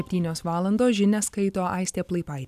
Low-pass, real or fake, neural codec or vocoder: 14.4 kHz; real; none